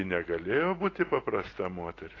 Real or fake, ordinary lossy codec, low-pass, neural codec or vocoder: real; AAC, 32 kbps; 7.2 kHz; none